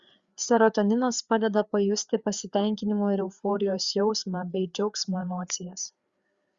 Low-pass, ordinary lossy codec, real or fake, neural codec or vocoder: 7.2 kHz; Opus, 64 kbps; fake; codec, 16 kHz, 4 kbps, FreqCodec, larger model